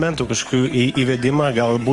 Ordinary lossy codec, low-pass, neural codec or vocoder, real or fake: Opus, 24 kbps; 10.8 kHz; codec, 44.1 kHz, 7.8 kbps, DAC; fake